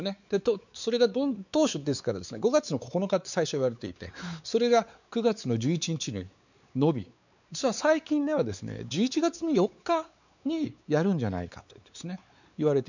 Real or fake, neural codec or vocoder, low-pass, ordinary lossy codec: fake; codec, 16 kHz, 4 kbps, X-Codec, WavLM features, trained on Multilingual LibriSpeech; 7.2 kHz; none